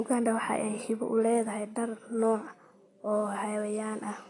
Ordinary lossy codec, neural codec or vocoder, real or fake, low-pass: AAC, 32 kbps; none; real; 10.8 kHz